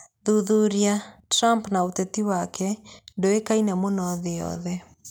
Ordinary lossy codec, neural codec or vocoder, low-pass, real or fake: none; none; none; real